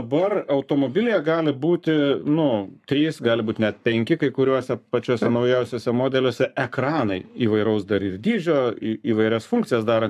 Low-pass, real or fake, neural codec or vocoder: 14.4 kHz; fake; codec, 44.1 kHz, 7.8 kbps, Pupu-Codec